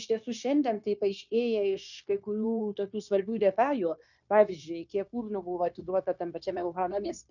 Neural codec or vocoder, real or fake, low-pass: codec, 16 kHz, 0.9 kbps, LongCat-Audio-Codec; fake; 7.2 kHz